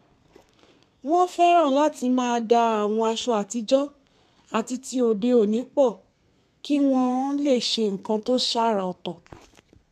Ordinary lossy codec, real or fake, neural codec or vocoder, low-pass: none; fake; codec, 32 kHz, 1.9 kbps, SNAC; 14.4 kHz